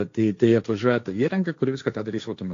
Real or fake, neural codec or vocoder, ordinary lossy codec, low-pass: fake; codec, 16 kHz, 1.1 kbps, Voila-Tokenizer; AAC, 48 kbps; 7.2 kHz